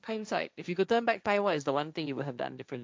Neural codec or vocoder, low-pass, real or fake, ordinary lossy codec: codec, 16 kHz, 1.1 kbps, Voila-Tokenizer; none; fake; none